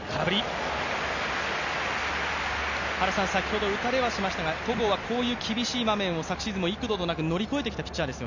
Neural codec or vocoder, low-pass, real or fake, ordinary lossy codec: none; 7.2 kHz; real; none